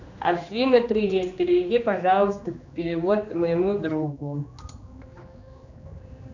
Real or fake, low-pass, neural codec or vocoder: fake; 7.2 kHz; codec, 16 kHz, 2 kbps, X-Codec, HuBERT features, trained on general audio